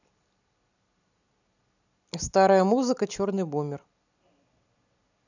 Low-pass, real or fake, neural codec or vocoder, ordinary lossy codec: 7.2 kHz; real; none; none